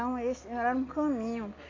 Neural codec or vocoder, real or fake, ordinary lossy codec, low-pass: none; real; AAC, 32 kbps; 7.2 kHz